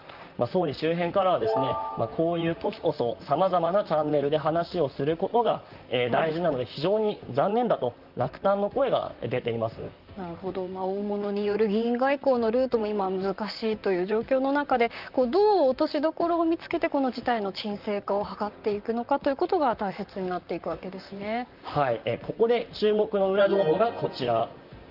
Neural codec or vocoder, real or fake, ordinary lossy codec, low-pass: vocoder, 44.1 kHz, 128 mel bands, Pupu-Vocoder; fake; Opus, 24 kbps; 5.4 kHz